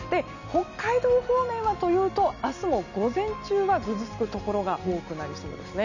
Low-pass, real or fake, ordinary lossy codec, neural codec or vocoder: 7.2 kHz; real; none; none